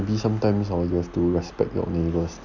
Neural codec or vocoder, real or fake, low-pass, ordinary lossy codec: none; real; 7.2 kHz; none